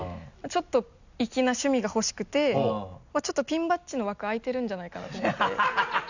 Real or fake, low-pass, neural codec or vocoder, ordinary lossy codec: real; 7.2 kHz; none; none